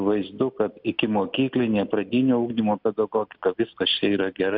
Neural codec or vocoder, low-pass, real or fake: none; 5.4 kHz; real